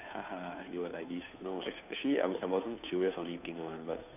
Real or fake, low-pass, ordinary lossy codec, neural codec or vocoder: fake; 3.6 kHz; none; codec, 16 kHz, 2 kbps, FunCodec, trained on Chinese and English, 25 frames a second